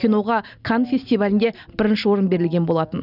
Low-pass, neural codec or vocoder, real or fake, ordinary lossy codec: 5.4 kHz; none; real; none